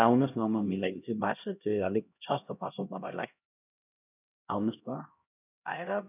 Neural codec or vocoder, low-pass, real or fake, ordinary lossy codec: codec, 16 kHz, 1 kbps, X-Codec, HuBERT features, trained on LibriSpeech; 3.6 kHz; fake; none